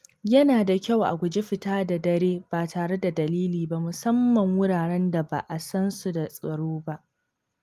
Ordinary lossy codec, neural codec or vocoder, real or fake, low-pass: Opus, 32 kbps; none; real; 14.4 kHz